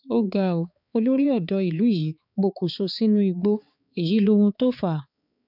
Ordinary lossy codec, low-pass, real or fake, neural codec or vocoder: none; 5.4 kHz; fake; codec, 16 kHz, 4 kbps, X-Codec, HuBERT features, trained on balanced general audio